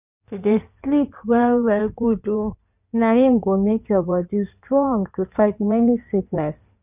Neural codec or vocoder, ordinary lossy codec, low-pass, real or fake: codec, 16 kHz in and 24 kHz out, 2.2 kbps, FireRedTTS-2 codec; none; 3.6 kHz; fake